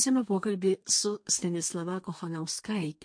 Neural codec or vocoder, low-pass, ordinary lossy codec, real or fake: codec, 32 kHz, 1.9 kbps, SNAC; 9.9 kHz; MP3, 48 kbps; fake